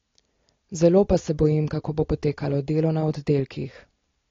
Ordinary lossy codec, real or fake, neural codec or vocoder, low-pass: AAC, 32 kbps; real; none; 7.2 kHz